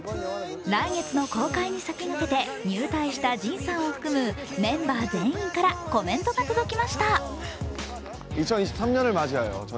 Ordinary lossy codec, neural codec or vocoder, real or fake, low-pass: none; none; real; none